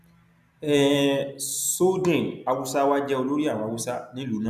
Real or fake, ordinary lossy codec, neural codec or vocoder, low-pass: real; none; none; 14.4 kHz